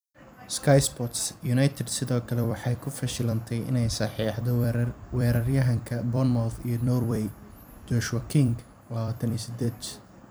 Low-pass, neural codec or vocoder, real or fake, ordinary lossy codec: none; vocoder, 44.1 kHz, 128 mel bands every 256 samples, BigVGAN v2; fake; none